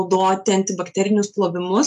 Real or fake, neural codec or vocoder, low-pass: real; none; 10.8 kHz